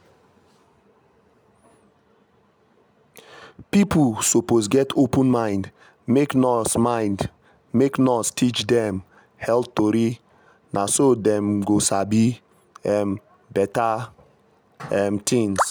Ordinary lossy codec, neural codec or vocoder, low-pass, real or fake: none; none; none; real